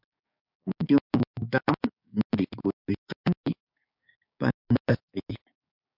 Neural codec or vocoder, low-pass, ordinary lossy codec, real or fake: codec, 16 kHz, 6 kbps, DAC; 5.4 kHz; MP3, 48 kbps; fake